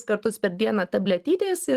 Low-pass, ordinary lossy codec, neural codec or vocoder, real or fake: 14.4 kHz; Opus, 24 kbps; vocoder, 44.1 kHz, 128 mel bands every 256 samples, BigVGAN v2; fake